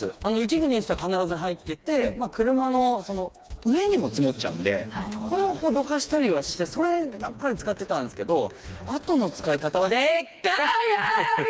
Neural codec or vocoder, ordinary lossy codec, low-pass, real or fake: codec, 16 kHz, 2 kbps, FreqCodec, smaller model; none; none; fake